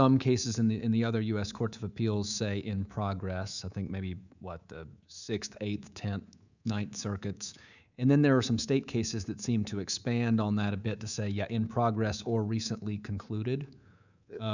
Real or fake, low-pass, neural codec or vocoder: fake; 7.2 kHz; codec, 24 kHz, 3.1 kbps, DualCodec